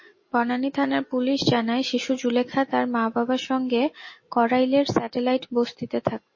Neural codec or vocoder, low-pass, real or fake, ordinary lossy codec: none; 7.2 kHz; real; MP3, 32 kbps